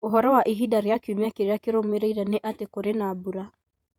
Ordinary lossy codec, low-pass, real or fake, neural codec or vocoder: none; 19.8 kHz; fake; vocoder, 48 kHz, 128 mel bands, Vocos